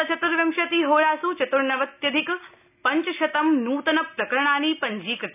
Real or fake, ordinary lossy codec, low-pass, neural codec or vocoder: real; none; 3.6 kHz; none